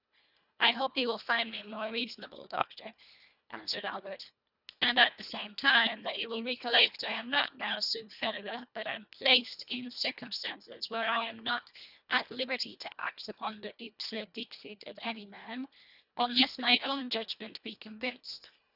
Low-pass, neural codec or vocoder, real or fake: 5.4 kHz; codec, 24 kHz, 1.5 kbps, HILCodec; fake